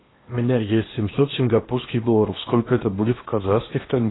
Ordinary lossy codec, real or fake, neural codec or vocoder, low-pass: AAC, 16 kbps; fake; codec, 16 kHz in and 24 kHz out, 0.8 kbps, FocalCodec, streaming, 65536 codes; 7.2 kHz